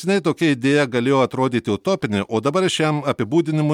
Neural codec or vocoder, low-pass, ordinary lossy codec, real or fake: none; 19.8 kHz; MP3, 96 kbps; real